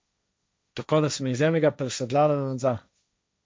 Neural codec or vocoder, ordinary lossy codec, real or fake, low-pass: codec, 16 kHz, 1.1 kbps, Voila-Tokenizer; none; fake; none